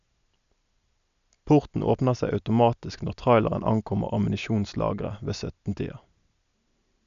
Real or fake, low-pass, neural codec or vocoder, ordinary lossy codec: real; 7.2 kHz; none; none